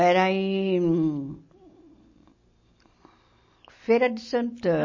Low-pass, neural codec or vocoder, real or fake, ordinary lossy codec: 7.2 kHz; none; real; MP3, 32 kbps